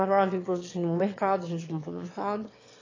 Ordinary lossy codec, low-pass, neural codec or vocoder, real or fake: AAC, 32 kbps; 7.2 kHz; autoencoder, 22.05 kHz, a latent of 192 numbers a frame, VITS, trained on one speaker; fake